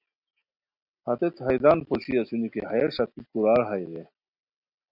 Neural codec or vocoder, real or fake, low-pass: none; real; 5.4 kHz